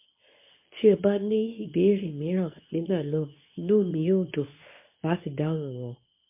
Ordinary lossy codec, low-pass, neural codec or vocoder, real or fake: MP3, 24 kbps; 3.6 kHz; codec, 24 kHz, 0.9 kbps, WavTokenizer, medium speech release version 2; fake